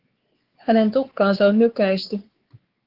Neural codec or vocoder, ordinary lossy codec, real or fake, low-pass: codec, 16 kHz, 4 kbps, X-Codec, WavLM features, trained on Multilingual LibriSpeech; Opus, 16 kbps; fake; 5.4 kHz